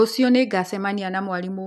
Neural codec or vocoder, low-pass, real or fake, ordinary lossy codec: none; 14.4 kHz; real; none